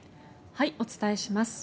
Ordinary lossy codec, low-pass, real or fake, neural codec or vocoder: none; none; real; none